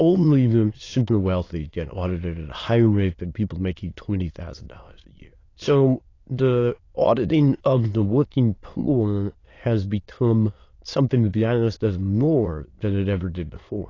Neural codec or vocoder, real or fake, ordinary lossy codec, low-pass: autoencoder, 22.05 kHz, a latent of 192 numbers a frame, VITS, trained on many speakers; fake; AAC, 32 kbps; 7.2 kHz